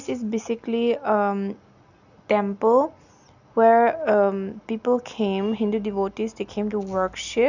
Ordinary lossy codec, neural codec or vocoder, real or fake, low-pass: none; none; real; 7.2 kHz